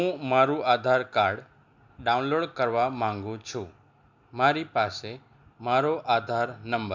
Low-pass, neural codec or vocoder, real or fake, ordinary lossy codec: 7.2 kHz; none; real; MP3, 64 kbps